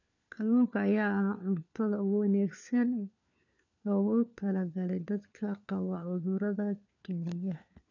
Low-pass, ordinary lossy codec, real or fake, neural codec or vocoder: 7.2 kHz; none; fake; codec, 16 kHz, 4 kbps, FunCodec, trained on LibriTTS, 50 frames a second